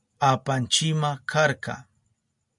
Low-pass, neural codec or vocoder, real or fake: 10.8 kHz; none; real